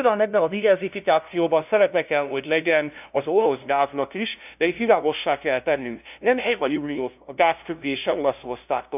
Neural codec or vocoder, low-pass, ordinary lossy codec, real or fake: codec, 16 kHz, 0.5 kbps, FunCodec, trained on LibriTTS, 25 frames a second; 3.6 kHz; none; fake